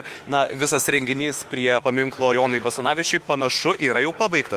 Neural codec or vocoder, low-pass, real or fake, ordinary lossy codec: autoencoder, 48 kHz, 32 numbers a frame, DAC-VAE, trained on Japanese speech; 14.4 kHz; fake; Opus, 32 kbps